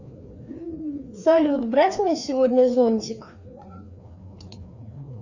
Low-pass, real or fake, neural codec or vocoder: 7.2 kHz; fake; codec, 16 kHz, 2 kbps, FreqCodec, larger model